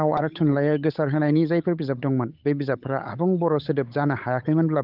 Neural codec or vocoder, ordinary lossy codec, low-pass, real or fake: codec, 16 kHz, 8 kbps, FunCodec, trained on Chinese and English, 25 frames a second; Opus, 24 kbps; 5.4 kHz; fake